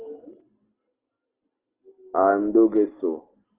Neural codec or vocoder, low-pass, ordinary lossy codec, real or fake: none; 3.6 kHz; Opus, 16 kbps; real